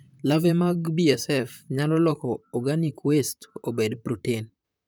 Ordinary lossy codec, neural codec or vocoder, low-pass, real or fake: none; vocoder, 44.1 kHz, 128 mel bands, Pupu-Vocoder; none; fake